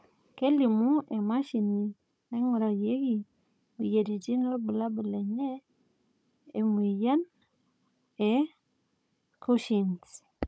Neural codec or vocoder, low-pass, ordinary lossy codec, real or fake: codec, 16 kHz, 16 kbps, FunCodec, trained on Chinese and English, 50 frames a second; none; none; fake